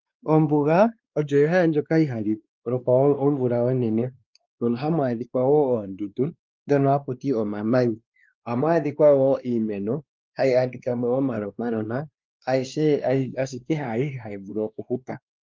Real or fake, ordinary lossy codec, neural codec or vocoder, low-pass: fake; Opus, 32 kbps; codec, 16 kHz, 2 kbps, X-Codec, WavLM features, trained on Multilingual LibriSpeech; 7.2 kHz